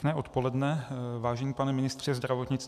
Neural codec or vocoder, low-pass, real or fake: none; 14.4 kHz; real